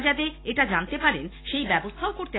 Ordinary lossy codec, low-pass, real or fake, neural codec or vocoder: AAC, 16 kbps; 7.2 kHz; real; none